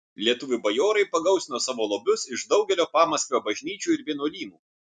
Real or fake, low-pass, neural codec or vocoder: real; 10.8 kHz; none